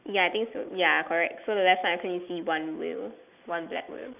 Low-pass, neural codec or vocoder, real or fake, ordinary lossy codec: 3.6 kHz; none; real; none